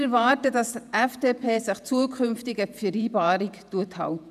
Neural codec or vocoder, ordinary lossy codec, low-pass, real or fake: vocoder, 48 kHz, 128 mel bands, Vocos; none; 14.4 kHz; fake